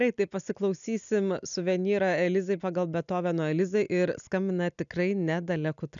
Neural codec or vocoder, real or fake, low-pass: none; real; 7.2 kHz